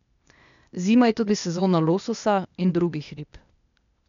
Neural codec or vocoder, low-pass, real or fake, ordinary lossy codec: codec, 16 kHz, 0.8 kbps, ZipCodec; 7.2 kHz; fake; none